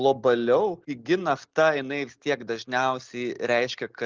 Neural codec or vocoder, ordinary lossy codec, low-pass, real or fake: none; Opus, 32 kbps; 7.2 kHz; real